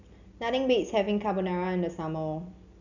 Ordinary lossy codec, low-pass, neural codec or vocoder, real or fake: none; 7.2 kHz; none; real